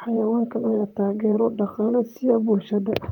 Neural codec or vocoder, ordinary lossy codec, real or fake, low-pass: vocoder, 44.1 kHz, 128 mel bands, Pupu-Vocoder; Opus, 32 kbps; fake; 19.8 kHz